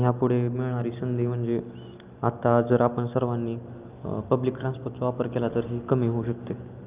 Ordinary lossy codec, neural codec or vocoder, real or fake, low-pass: Opus, 24 kbps; none; real; 3.6 kHz